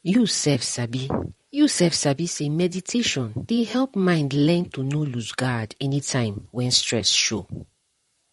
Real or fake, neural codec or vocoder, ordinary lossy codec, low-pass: fake; vocoder, 44.1 kHz, 128 mel bands, Pupu-Vocoder; MP3, 48 kbps; 19.8 kHz